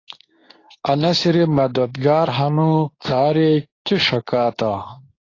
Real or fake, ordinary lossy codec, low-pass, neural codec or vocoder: fake; AAC, 32 kbps; 7.2 kHz; codec, 24 kHz, 0.9 kbps, WavTokenizer, medium speech release version 2